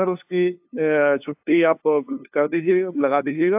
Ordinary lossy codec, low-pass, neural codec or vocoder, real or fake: AAC, 32 kbps; 3.6 kHz; codec, 16 kHz, 2 kbps, FunCodec, trained on LibriTTS, 25 frames a second; fake